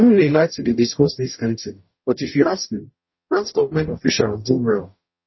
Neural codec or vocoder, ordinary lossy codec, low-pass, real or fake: codec, 44.1 kHz, 0.9 kbps, DAC; MP3, 24 kbps; 7.2 kHz; fake